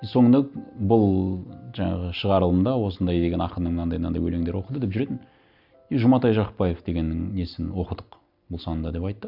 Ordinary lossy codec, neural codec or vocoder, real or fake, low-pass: none; none; real; 5.4 kHz